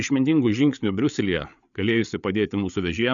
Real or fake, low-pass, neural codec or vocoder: fake; 7.2 kHz; codec, 16 kHz, 8 kbps, FreqCodec, larger model